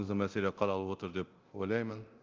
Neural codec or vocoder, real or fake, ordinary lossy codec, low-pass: codec, 24 kHz, 0.9 kbps, DualCodec; fake; Opus, 32 kbps; 7.2 kHz